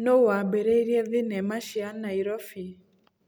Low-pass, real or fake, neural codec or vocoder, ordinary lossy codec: none; real; none; none